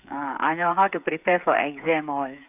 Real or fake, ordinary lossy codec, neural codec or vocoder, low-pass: fake; AAC, 32 kbps; codec, 16 kHz, 8 kbps, FreqCodec, smaller model; 3.6 kHz